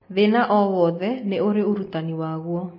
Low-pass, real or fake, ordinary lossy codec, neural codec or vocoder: 5.4 kHz; real; MP3, 24 kbps; none